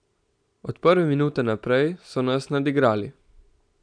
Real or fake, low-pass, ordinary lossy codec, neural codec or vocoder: real; 9.9 kHz; none; none